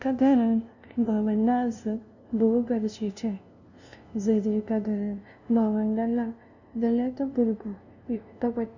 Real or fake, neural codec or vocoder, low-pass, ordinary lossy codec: fake; codec, 16 kHz, 0.5 kbps, FunCodec, trained on LibriTTS, 25 frames a second; 7.2 kHz; none